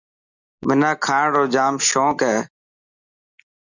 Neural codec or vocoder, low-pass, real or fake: none; 7.2 kHz; real